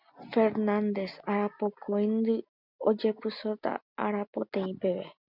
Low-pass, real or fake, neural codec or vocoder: 5.4 kHz; real; none